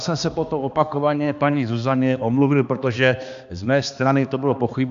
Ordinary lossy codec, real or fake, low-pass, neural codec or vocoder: MP3, 64 kbps; fake; 7.2 kHz; codec, 16 kHz, 2 kbps, X-Codec, HuBERT features, trained on balanced general audio